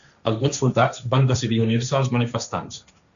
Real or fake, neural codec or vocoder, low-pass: fake; codec, 16 kHz, 1.1 kbps, Voila-Tokenizer; 7.2 kHz